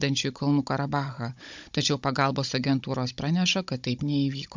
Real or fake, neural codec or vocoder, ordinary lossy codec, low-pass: fake; codec, 16 kHz, 16 kbps, FunCodec, trained on Chinese and English, 50 frames a second; MP3, 64 kbps; 7.2 kHz